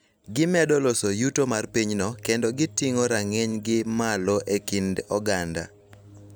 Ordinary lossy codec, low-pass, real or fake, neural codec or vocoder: none; none; real; none